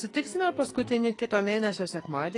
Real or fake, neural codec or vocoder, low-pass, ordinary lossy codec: fake; codec, 44.1 kHz, 2.6 kbps, SNAC; 10.8 kHz; AAC, 32 kbps